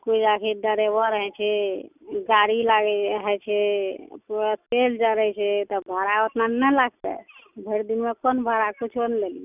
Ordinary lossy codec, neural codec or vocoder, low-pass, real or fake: none; none; 3.6 kHz; real